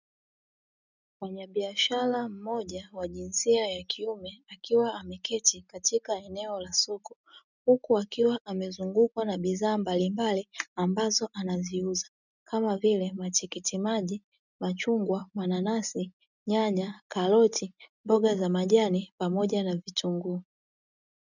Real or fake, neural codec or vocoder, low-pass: real; none; 7.2 kHz